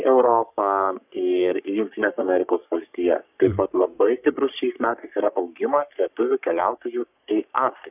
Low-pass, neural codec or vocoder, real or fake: 3.6 kHz; codec, 44.1 kHz, 3.4 kbps, Pupu-Codec; fake